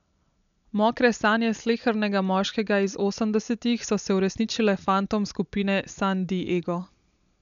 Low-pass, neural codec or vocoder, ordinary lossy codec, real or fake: 7.2 kHz; none; none; real